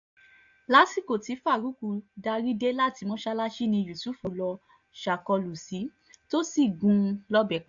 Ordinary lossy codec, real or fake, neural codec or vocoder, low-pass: AAC, 64 kbps; real; none; 7.2 kHz